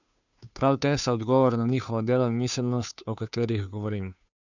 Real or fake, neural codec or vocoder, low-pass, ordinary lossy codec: fake; codec, 16 kHz, 2 kbps, FunCodec, trained on Chinese and English, 25 frames a second; 7.2 kHz; none